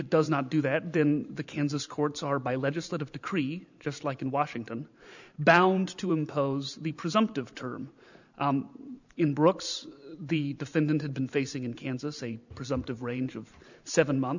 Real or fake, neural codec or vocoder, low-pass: fake; vocoder, 44.1 kHz, 128 mel bands every 512 samples, BigVGAN v2; 7.2 kHz